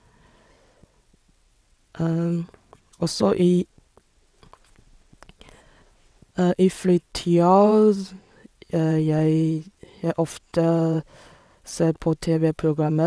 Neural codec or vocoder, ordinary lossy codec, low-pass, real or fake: vocoder, 22.05 kHz, 80 mel bands, WaveNeXt; none; none; fake